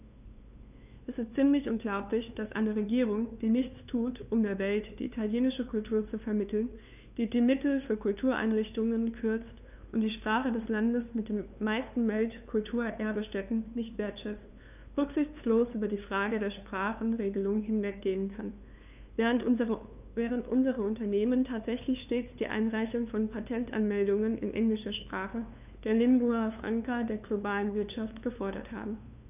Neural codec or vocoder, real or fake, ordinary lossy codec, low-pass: codec, 16 kHz, 2 kbps, FunCodec, trained on LibriTTS, 25 frames a second; fake; none; 3.6 kHz